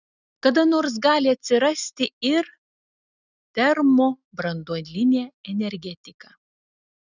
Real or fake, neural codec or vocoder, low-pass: real; none; 7.2 kHz